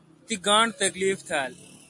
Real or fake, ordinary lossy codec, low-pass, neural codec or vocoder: real; MP3, 48 kbps; 10.8 kHz; none